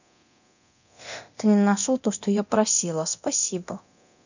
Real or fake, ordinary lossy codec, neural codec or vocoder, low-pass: fake; none; codec, 24 kHz, 0.9 kbps, DualCodec; 7.2 kHz